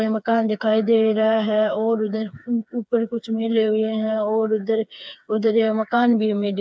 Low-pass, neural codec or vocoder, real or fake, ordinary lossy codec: none; codec, 16 kHz, 4 kbps, FreqCodec, smaller model; fake; none